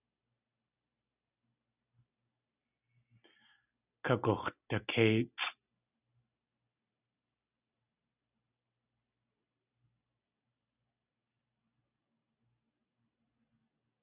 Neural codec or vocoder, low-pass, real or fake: none; 3.6 kHz; real